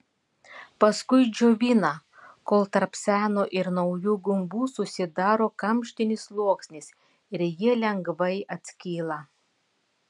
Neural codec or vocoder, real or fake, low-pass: none; real; 10.8 kHz